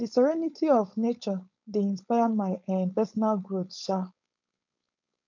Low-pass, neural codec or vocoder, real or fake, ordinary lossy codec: 7.2 kHz; codec, 16 kHz, 4.8 kbps, FACodec; fake; none